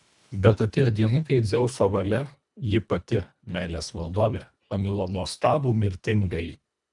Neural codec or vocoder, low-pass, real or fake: codec, 24 kHz, 1.5 kbps, HILCodec; 10.8 kHz; fake